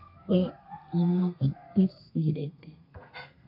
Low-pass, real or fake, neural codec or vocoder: 5.4 kHz; fake; codec, 32 kHz, 1.9 kbps, SNAC